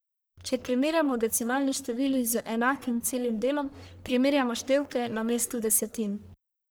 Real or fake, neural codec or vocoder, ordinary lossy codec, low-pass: fake; codec, 44.1 kHz, 1.7 kbps, Pupu-Codec; none; none